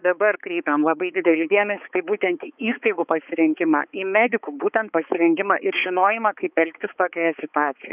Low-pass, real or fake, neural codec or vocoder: 3.6 kHz; fake; codec, 16 kHz, 4 kbps, X-Codec, HuBERT features, trained on balanced general audio